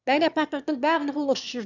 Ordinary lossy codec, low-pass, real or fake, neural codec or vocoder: none; 7.2 kHz; fake; autoencoder, 22.05 kHz, a latent of 192 numbers a frame, VITS, trained on one speaker